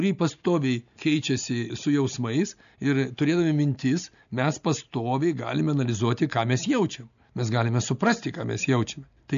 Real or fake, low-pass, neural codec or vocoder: real; 7.2 kHz; none